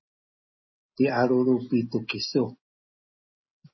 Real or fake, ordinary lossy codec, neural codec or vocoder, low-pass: real; MP3, 24 kbps; none; 7.2 kHz